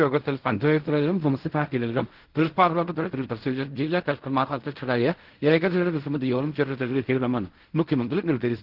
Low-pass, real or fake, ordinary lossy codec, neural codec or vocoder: 5.4 kHz; fake; Opus, 16 kbps; codec, 16 kHz in and 24 kHz out, 0.4 kbps, LongCat-Audio-Codec, fine tuned four codebook decoder